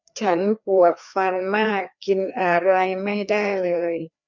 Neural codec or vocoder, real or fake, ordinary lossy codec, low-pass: codec, 16 kHz, 2 kbps, FreqCodec, larger model; fake; none; 7.2 kHz